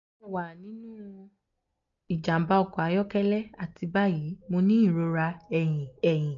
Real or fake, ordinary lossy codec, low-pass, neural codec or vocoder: real; none; 7.2 kHz; none